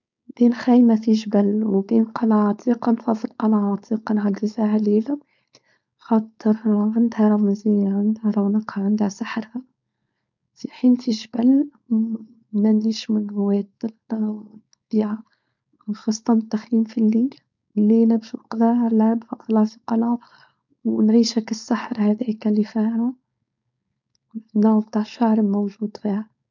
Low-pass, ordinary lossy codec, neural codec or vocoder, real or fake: 7.2 kHz; none; codec, 16 kHz, 4.8 kbps, FACodec; fake